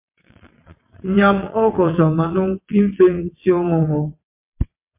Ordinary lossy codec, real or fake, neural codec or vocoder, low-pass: MP3, 32 kbps; fake; vocoder, 22.05 kHz, 80 mel bands, WaveNeXt; 3.6 kHz